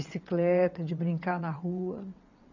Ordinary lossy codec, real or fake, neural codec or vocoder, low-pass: none; fake; vocoder, 22.05 kHz, 80 mel bands, WaveNeXt; 7.2 kHz